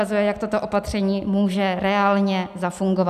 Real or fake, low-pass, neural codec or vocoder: real; 14.4 kHz; none